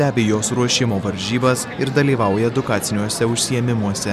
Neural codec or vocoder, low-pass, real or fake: none; 14.4 kHz; real